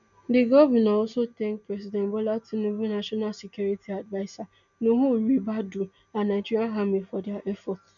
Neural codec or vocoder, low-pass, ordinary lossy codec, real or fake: none; 7.2 kHz; none; real